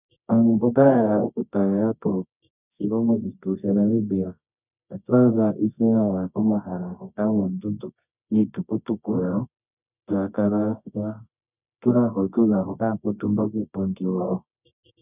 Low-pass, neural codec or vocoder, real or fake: 3.6 kHz; codec, 24 kHz, 0.9 kbps, WavTokenizer, medium music audio release; fake